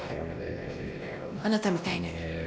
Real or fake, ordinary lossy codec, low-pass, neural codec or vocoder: fake; none; none; codec, 16 kHz, 1 kbps, X-Codec, WavLM features, trained on Multilingual LibriSpeech